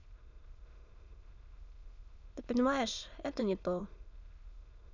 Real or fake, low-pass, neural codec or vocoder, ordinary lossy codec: fake; 7.2 kHz; autoencoder, 22.05 kHz, a latent of 192 numbers a frame, VITS, trained on many speakers; none